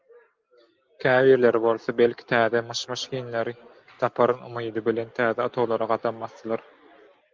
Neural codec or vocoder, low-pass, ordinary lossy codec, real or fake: none; 7.2 kHz; Opus, 32 kbps; real